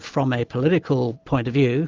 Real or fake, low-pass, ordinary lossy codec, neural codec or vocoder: real; 7.2 kHz; Opus, 32 kbps; none